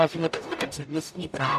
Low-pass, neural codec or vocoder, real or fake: 14.4 kHz; codec, 44.1 kHz, 0.9 kbps, DAC; fake